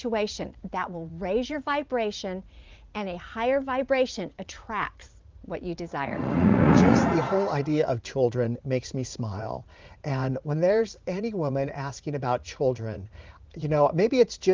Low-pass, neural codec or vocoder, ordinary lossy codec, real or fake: 7.2 kHz; vocoder, 44.1 kHz, 80 mel bands, Vocos; Opus, 32 kbps; fake